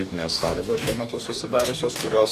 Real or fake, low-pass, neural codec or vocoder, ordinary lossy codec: fake; 14.4 kHz; codec, 44.1 kHz, 2.6 kbps, DAC; Opus, 64 kbps